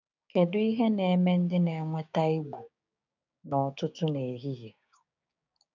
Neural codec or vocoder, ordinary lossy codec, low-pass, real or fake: codec, 16 kHz, 6 kbps, DAC; none; 7.2 kHz; fake